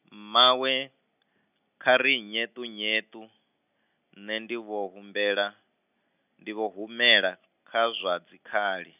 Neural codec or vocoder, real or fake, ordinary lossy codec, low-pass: none; real; none; 3.6 kHz